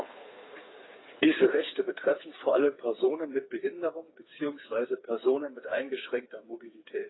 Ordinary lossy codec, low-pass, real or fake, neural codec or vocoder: AAC, 16 kbps; 7.2 kHz; fake; codec, 16 kHz, 4 kbps, FreqCodec, smaller model